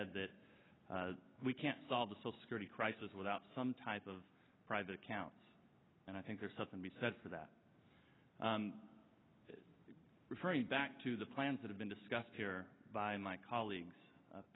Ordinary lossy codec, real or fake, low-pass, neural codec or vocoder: AAC, 16 kbps; real; 7.2 kHz; none